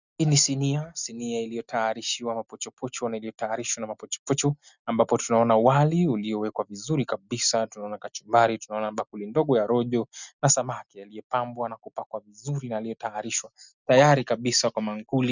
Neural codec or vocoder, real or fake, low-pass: none; real; 7.2 kHz